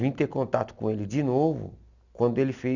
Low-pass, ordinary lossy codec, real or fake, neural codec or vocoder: 7.2 kHz; none; real; none